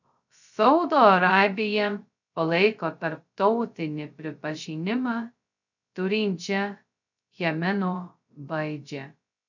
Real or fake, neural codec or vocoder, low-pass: fake; codec, 16 kHz, 0.2 kbps, FocalCodec; 7.2 kHz